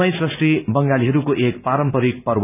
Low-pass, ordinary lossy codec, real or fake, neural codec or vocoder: 3.6 kHz; none; real; none